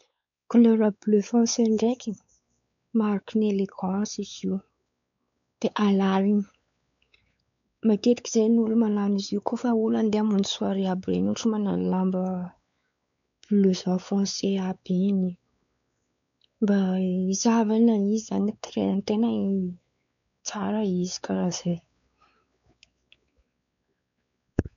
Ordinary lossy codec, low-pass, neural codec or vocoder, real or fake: none; 7.2 kHz; codec, 16 kHz, 4 kbps, X-Codec, WavLM features, trained on Multilingual LibriSpeech; fake